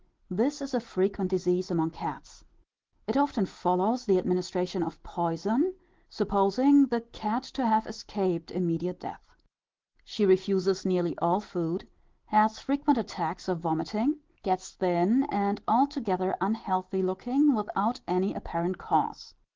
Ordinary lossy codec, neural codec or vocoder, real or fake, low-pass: Opus, 16 kbps; none; real; 7.2 kHz